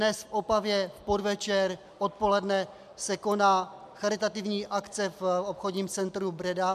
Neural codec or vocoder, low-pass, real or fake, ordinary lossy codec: none; 14.4 kHz; real; MP3, 96 kbps